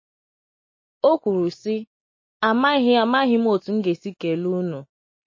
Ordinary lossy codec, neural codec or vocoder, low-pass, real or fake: MP3, 32 kbps; none; 7.2 kHz; real